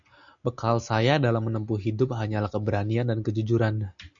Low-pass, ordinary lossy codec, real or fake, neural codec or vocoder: 7.2 kHz; MP3, 96 kbps; real; none